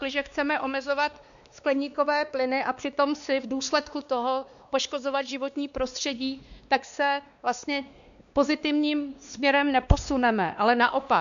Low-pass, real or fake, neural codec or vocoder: 7.2 kHz; fake; codec, 16 kHz, 2 kbps, X-Codec, WavLM features, trained on Multilingual LibriSpeech